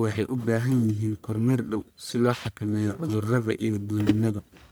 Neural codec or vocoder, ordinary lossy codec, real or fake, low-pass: codec, 44.1 kHz, 1.7 kbps, Pupu-Codec; none; fake; none